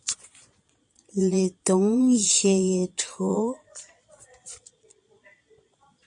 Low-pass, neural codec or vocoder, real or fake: 9.9 kHz; vocoder, 22.05 kHz, 80 mel bands, Vocos; fake